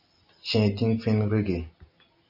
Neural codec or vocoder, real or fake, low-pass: none; real; 5.4 kHz